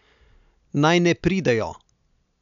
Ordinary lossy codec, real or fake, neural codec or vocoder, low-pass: none; real; none; 7.2 kHz